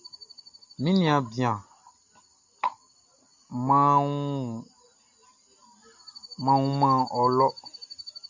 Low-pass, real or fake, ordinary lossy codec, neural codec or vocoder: 7.2 kHz; real; MP3, 64 kbps; none